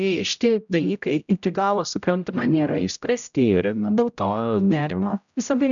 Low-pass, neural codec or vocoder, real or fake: 7.2 kHz; codec, 16 kHz, 0.5 kbps, X-Codec, HuBERT features, trained on general audio; fake